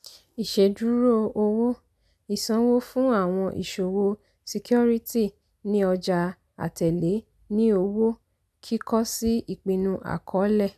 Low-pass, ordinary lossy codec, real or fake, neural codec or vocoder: 14.4 kHz; none; real; none